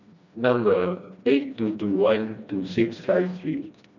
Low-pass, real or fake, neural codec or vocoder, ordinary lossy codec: 7.2 kHz; fake; codec, 16 kHz, 1 kbps, FreqCodec, smaller model; none